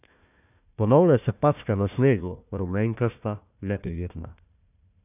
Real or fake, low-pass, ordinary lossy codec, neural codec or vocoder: fake; 3.6 kHz; none; codec, 16 kHz, 1 kbps, FunCodec, trained on Chinese and English, 50 frames a second